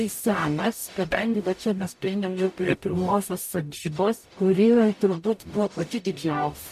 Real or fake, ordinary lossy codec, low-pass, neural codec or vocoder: fake; MP3, 64 kbps; 14.4 kHz; codec, 44.1 kHz, 0.9 kbps, DAC